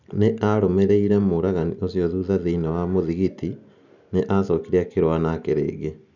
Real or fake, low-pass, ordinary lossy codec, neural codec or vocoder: real; 7.2 kHz; none; none